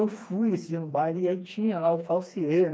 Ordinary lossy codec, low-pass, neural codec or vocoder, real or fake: none; none; codec, 16 kHz, 2 kbps, FreqCodec, smaller model; fake